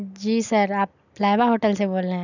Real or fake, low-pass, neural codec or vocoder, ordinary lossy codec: real; 7.2 kHz; none; none